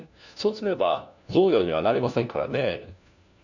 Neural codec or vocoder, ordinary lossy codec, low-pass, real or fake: codec, 16 kHz, 1 kbps, FunCodec, trained on LibriTTS, 50 frames a second; none; 7.2 kHz; fake